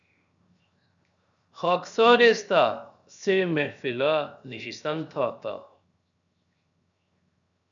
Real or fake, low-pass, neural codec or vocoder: fake; 7.2 kHz; codec, 16 kHz, 0.7 kbps, FocalCodec